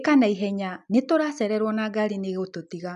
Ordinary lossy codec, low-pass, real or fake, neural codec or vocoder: none; 10.8 kHz; real; none